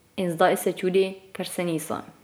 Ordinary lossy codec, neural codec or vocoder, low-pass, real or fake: none; none; none; real